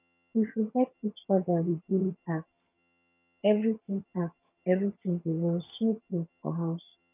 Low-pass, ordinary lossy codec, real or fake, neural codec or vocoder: 3.6 kHz; none; fake; vocoder, 22.05 kHz, 80 mel bands, HiFi-GAN